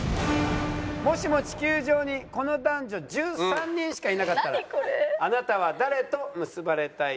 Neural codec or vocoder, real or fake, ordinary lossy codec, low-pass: none; real; none; none